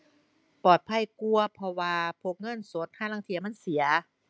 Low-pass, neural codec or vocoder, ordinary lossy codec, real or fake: none; none; none; real